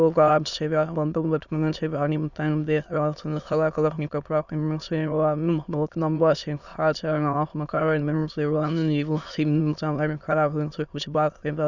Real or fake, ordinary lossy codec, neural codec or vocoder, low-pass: fake; none; autoencoder, 22.05 kHz, a latent of 192 numbers a frame, VITS, trained on many speakers; 7.2 kHz